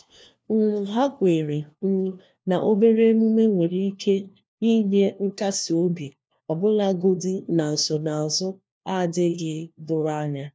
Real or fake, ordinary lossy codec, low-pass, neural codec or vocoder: fake; none; none; codec, 16 kHz, 1 kbps, FunCodec, trained on LibriTTS, 50 frames a second